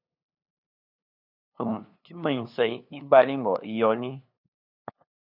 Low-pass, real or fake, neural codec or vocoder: 5.4 kHz; fake; codec, 16 kHz, 2 kbps, FunCodec, trained on LibriTTS, 25 frames a second